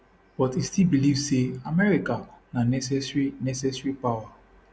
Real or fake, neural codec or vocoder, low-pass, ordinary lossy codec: real; none; none; none